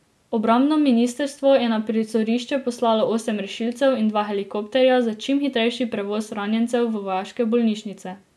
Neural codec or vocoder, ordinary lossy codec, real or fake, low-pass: none; none; real; none